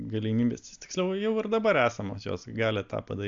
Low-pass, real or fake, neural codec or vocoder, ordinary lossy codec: 7.2 kHz; real; none; AAC, 64 kbps